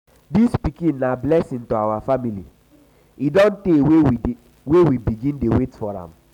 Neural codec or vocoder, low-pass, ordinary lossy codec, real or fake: none; 19.8 kHz; none; real